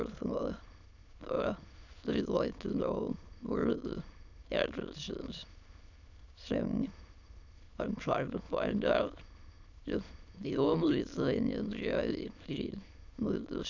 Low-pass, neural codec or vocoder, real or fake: 7.2 kHz; autoencoder, 22.05 kHz, a latent of 192 numbers a frame, VITS, trained on many speakers; fake